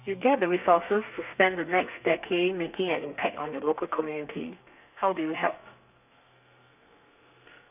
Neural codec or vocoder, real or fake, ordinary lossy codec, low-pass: codec, 32 kHz, 1.9 kbps, SNAC; fake; none; 3.6 kHz